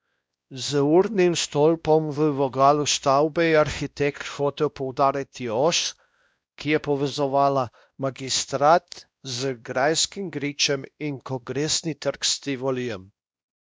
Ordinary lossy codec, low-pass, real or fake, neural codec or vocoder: none; none; fake; codec, 16 kHz, 1 kbps, X-Codec, WavLM features, trained on Multilingual LibriSpeech